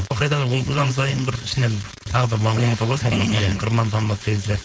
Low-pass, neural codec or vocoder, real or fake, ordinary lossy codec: none; codec, 16 kHz, 4.8 kbps, FACodec; fake; none